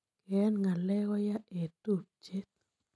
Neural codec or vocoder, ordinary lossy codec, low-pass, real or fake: none; none; none; real